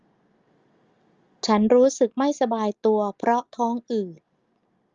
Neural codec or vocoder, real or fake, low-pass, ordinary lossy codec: none; real; 7.2 kHz; Opus, 32 kbps